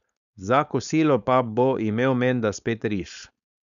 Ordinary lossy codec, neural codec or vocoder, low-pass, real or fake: none; codec, 16 kHz, 4.8 kbps, FACodec; 7.2 kHz; fake